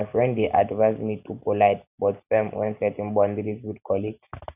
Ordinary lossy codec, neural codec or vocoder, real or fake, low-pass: none; none; real; 3.6 kHz